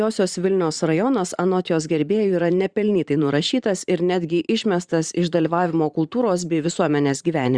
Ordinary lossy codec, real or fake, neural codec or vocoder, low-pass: Opus, 64 kbps; fake; vocoder, 44.1 kHz, 128 mel bands every 512 samples, BigVGAN v2; 9.9 kHz